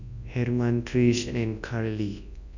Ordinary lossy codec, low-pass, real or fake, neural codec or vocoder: none; 7.2 kHz; fake; codec, 24 kHz, 0.9 kbps, WavTokenizer, large speech release